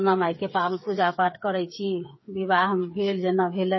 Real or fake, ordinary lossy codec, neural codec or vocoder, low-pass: fake; MP3, 24 kbps; codec, 16 kHz, 8 kbps, FreqCodec, smaller model; 7.2 kHz